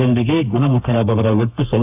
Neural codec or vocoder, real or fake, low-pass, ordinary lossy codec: codec, 32 kHz, 1.9 kbps, SNAC; fake; 3.6 kHz; AAC, 32 kbps